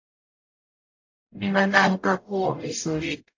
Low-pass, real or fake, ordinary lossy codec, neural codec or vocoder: 7.2 kHz; fake; AAC, 48 kbps; codec, 44.1 kHz, 0.9 kbps, DAC